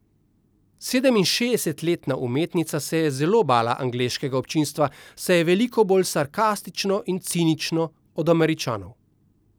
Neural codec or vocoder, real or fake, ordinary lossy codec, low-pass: none; real; none; none